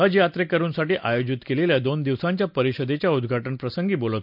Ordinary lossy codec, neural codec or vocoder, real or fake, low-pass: none; none; real; 5.4 kHz